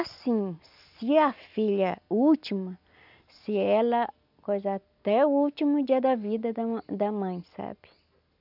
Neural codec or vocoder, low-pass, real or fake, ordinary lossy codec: none; 5.4 kHz; real; none